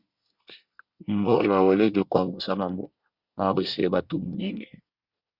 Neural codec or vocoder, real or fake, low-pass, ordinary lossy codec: codec, 24 kHz, 1 kbps, SNAC; fake; 5.4 kHz; Opus, 64 kbps